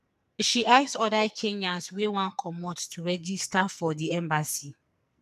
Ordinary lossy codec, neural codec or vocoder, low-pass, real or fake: none; codec, 44.1 kHz, 2.6 kbps, SNAC; 14.4 kHz; fake